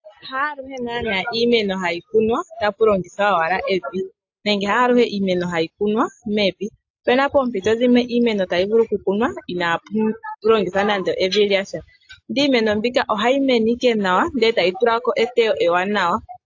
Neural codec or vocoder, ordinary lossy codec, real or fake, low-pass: none; AAC, 48 kbps; real; 7.2 kHz